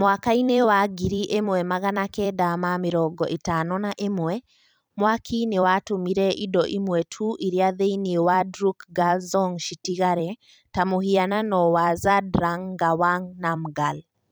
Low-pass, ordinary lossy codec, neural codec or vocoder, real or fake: none; none; vocoder, 44.1 kHz, 128 mel bands every 256 samples, BigVGAN v2; fake